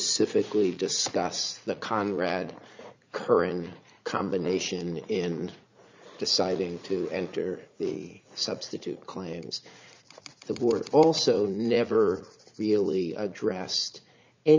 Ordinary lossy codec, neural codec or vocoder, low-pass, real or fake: MP3, 64 kbps; vocoder, 44.1 kHz, 80 mel bands, Vocos; 7.2 kHz; fake